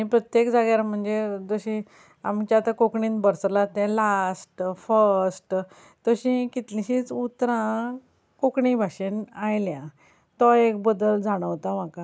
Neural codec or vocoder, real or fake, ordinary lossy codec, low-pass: none; real; none; none